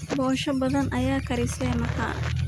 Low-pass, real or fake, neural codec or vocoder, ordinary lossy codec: 19.8 kHz; real; none; none